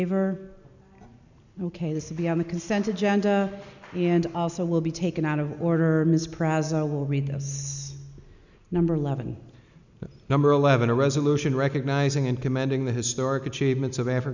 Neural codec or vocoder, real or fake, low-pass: none; real; 7.2 kHz